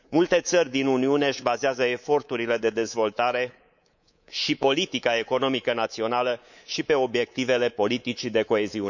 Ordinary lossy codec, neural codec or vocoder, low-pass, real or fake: none; codec, 24 kHz, 3.1 kbps, DualCodec; 7.2 kHz; fake